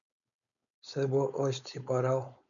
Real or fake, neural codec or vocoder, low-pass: fake; codec, 16 kHz, 4.8 kbps, FACodec; 7.2 kHz